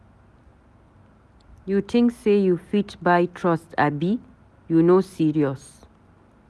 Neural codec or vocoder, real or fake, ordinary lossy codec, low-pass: none; real; none; none